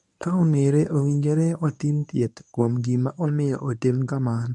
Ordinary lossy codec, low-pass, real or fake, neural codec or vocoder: none; none; fake; codec, 24 kHz, 0.9 kbps, WavTokenizer, medium speech release version 1